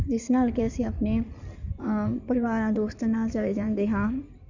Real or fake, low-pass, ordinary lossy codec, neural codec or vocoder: fake; 7.2 kHz; none; codec, 16 kHz in and 24 kHz out, 2.2 kbps, FireRedTTS-2 codec